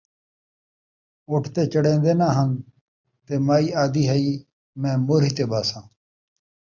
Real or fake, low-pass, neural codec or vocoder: real; 7.2 kHz; none